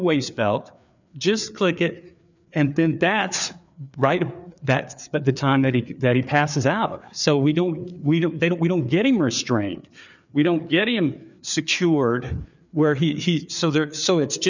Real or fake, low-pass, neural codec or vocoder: fake; 7.2 kHz; codec, 16 kHz, 4 kbps, FreqCodec, larger model